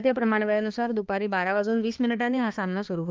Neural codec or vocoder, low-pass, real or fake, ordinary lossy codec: codec, 16 kHz, 2 kbps, X-Codec, HuBERT features, trained on balanced general audio; 7.2 kHz; fake; Opus, 32 kbps